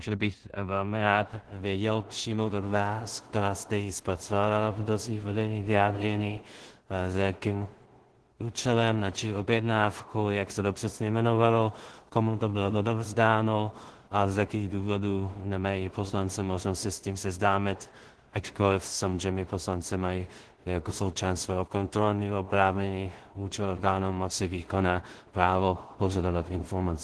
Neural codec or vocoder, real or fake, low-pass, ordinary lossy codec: codec, 16 kHz in and 24 kHz out, 0.4 kbps, LongCat-Audio-Codec, two codebook decoder; fake; 10.8 kHz; Opus, 16 kbps